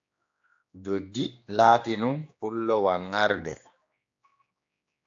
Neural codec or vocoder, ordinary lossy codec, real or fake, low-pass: codec, 16 kHz, 2 kbps, X-Codec, HuBERT features, trained on general audio; AAC, 48 kbps; fake; 7.2 kHz